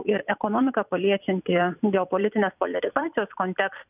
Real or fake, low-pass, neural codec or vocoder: fake; 3.6 kHz; vocoder, 44.1 kHz, 128 mel bands every 256 samples, BigVGAN v2